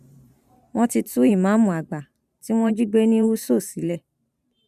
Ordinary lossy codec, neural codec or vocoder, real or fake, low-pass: none; vocoder, 44.1 kHz, 128 mel bands every 512 samples, BigVGAN v2; fake; 14.4 kHz